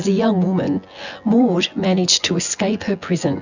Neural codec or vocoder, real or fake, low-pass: vocoder, 24 kHz, 100 mel bands, Vocos; fake; 7.2 kHz